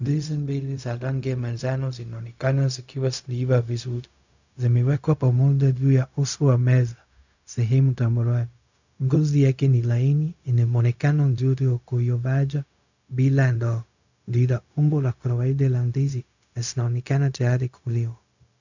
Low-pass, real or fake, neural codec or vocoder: 7.2 kHz; fake; codec, 16 kHz, 0.4 kbps, LongCat-Audio-Codec